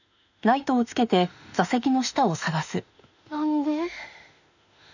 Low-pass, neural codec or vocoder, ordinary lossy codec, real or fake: 7.2 kHz; autoencoder, 48 kHz, 32 numbers a frame, DAC-VAE, trained on Japanese speech; none; fake